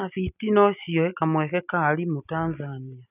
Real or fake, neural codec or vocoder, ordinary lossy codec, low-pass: real; none; none; 3.6 kHz